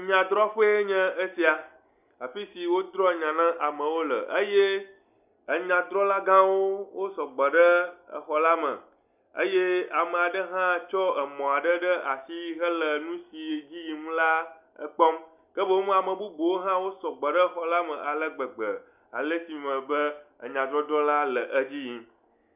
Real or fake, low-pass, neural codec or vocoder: real; 3.6 kHz; none